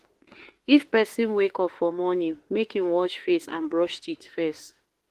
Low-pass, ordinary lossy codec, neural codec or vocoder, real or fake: 14.4 kHz; Opus, 16 kbps; autoencoder, 48 kHz, 32 numbers a frame, DAC-VAE, trained on Japanese speech; fake